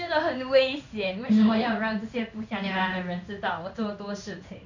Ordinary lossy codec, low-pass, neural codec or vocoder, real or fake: none; 7.2 kHz; codec, 16 kHz in and 24 kHz out, 1 kbps, XY-Tokenizer; fake